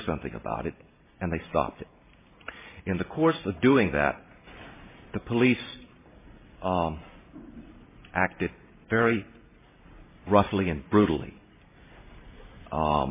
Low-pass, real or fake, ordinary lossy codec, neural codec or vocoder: 3.6 kHz; fake; MP3, 16 kbps; vocoder, 44.1 kHz, 128 mel bands every 512 samples, BigVGAN v2